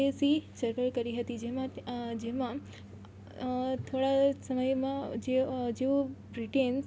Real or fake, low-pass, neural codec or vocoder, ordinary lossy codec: real; none; none; none